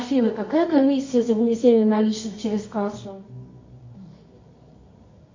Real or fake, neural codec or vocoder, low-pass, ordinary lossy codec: fake; codec, 24 kHz, 0.9 kbps, WavTokenizer, medium music audio release; 7.2 kHz; MP3, 64 kbps